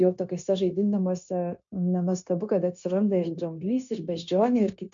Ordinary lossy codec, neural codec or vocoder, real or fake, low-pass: MP3, 64 kbps; codec, 16 kHz, 0.9 kbps, LongCat-Audio-Codec; fake; 7.2 kHz